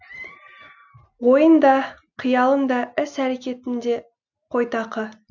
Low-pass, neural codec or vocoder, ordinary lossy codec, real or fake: 7.2 kHz; none; none; real